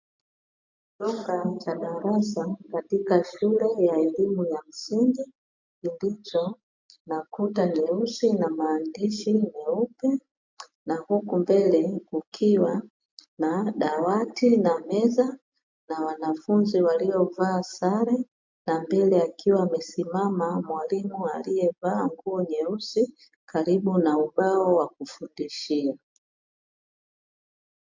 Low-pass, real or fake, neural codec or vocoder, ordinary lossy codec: 7.2 kHz; real; none; MP3, 64 kbps